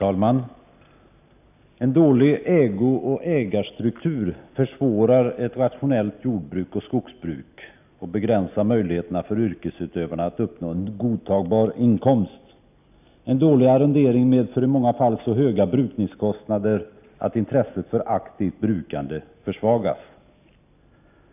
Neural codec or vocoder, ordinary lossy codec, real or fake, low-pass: none; none; real; 3.6 kHz